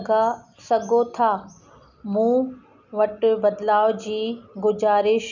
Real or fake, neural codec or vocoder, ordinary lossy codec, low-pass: real; none; none; 7.2 kHz